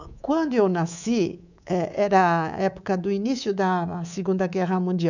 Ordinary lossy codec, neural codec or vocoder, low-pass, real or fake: none; codec, 24 kHz, 3.1 kbps, DualCodec; 7.2 kHz; fake